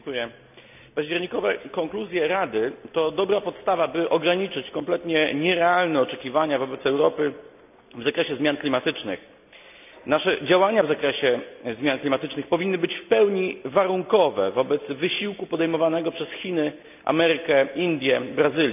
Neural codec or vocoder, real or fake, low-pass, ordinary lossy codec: none; real; 3.6 kHz; none